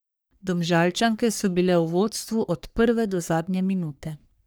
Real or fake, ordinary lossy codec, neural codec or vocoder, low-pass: fake; none; codec, 44.1 kHz, 3.4 kbps, Pupu-Codec; none